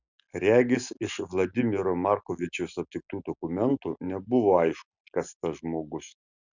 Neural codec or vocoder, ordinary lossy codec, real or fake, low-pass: none; Opus, 64 kbps; real; 7.2 kHz